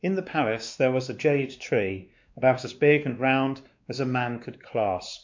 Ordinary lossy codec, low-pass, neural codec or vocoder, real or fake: MP3, 64 kbps; 7.2 kHz; codec, 16 kHz, 2 kbps, X-Codec, WavLM features, trained on Multilingual LibriSpeech; fake